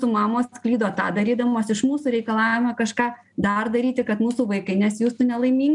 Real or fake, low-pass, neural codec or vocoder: real; 10.8 kHz; none